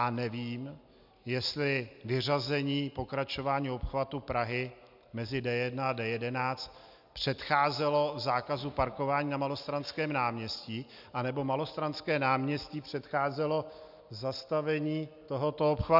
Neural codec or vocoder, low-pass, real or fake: none; 5.4 kHz; real